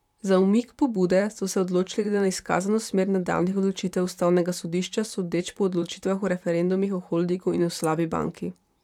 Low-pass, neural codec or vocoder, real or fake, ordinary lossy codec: 19.8 kHz; vocoder, 44.1 kHz, 128 mel bands, Pupu-Vocoder; fake; none